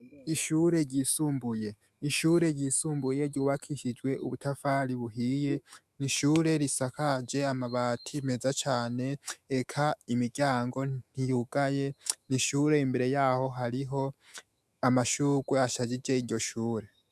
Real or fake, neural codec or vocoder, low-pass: fake; autoencoder, 48 kHz, 128 numbers a frame, DAC-VAE, trained on Japanese speech; 14.4 kHz